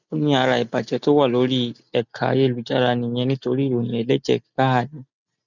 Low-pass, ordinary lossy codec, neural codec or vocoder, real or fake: 7.2 kHz; none; none; real